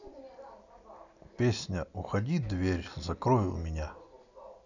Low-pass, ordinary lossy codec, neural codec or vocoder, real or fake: 7.2 kHz; none; none; real